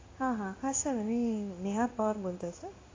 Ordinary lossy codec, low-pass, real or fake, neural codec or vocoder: AAC, 32 kbps; 7.2 kHz; fake; codec, 16 kHz in and 24 kHz out, 1 kbps, XY-Tokenizer